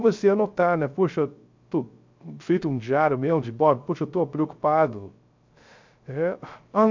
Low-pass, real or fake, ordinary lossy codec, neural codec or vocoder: 7.2 kHz; fake; MP3, 64 kbps; codec, 16 kHz, 0.3 kbps, FocalCodec